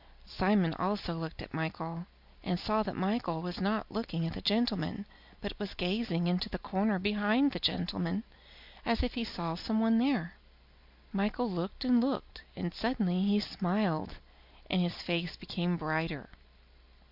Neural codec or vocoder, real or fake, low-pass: none; real; 5.4 kHz